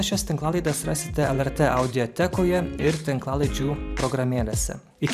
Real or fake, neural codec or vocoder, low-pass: fake; vocoder, 44.1 kHz, 128 mel bands every 512 samples, BigVGAN v2; 14.4 kHz